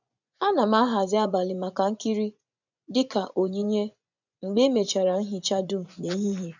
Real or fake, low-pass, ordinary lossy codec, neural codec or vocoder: fake; 7.2 kHz; none; vocoder, 24 kHz, 100 mel bands, Vocos